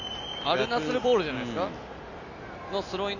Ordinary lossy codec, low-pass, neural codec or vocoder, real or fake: none; 7.2 kHz; none; real